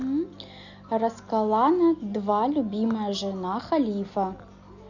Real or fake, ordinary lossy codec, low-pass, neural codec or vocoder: real; none; 7.2 kHz; none